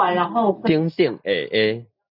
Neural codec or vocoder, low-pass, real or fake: none; 5.4 kHz; real